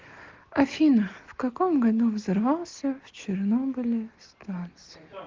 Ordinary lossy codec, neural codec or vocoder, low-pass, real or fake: Opus, 16 kbps; none; 7.2 kHz; real